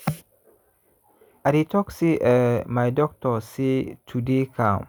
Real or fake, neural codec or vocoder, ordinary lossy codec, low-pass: real; none; none; none